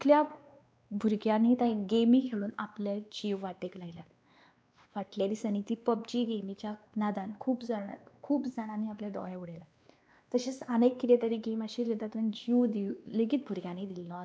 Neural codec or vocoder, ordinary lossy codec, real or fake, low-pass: codec, 16 kHz, 2 kbps, X-Codec, WavLM features, trained on Multilingual LibriSpeech; none; fake; none